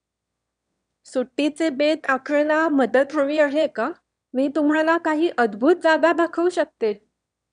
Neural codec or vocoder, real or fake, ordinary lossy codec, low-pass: autoencoder, 22.05 kHz, a latent of 192 numbers a frame, VITS, trained on one speaker; fake; none; 9.9 kHz